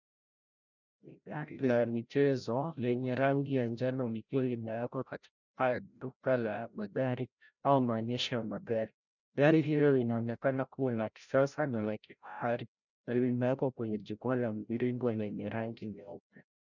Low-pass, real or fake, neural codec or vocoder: 7.2 kHz; fake; codec, 16 kHz, 0.5 kbps, FreqCodec, larger model